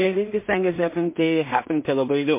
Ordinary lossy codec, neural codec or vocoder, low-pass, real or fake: MP3, 24 kbps; codec, 16 kHz in and 24 kHz out, 0.4 kbps, LongCat-Audio-Codec, two codebook decoder; 3.6 kHz; fake